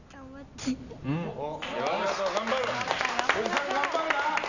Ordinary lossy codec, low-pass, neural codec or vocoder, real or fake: none; 7.2 kHz; none; real